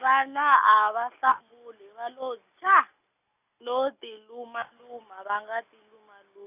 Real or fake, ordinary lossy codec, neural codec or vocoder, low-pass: real; none; none; 3.6 kHz